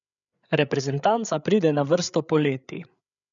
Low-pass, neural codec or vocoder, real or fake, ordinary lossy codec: 7.2 kHz; codec, 16 kHz, 8 kbps, FreqCodec, larger model; fake; none